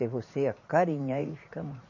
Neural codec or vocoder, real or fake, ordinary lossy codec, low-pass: vocoder, 44.1 kHz, 80 mel bands, Vocos; fake; MP3, 32 kbps; 7.2 kHz